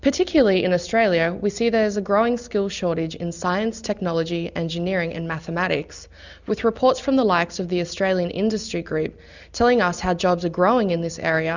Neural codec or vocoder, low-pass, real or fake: none; 7.2 kHz; real